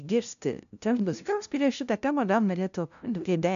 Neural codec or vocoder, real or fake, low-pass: codec, 16 kHz, 0.5 kbps, FunCodec, trained on LibriTTS, 25 frames a second; fake; 7.2 kHz